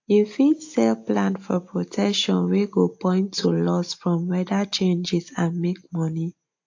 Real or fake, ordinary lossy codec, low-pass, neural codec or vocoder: real; AAC, 48 kbps; 7.2 kHz; none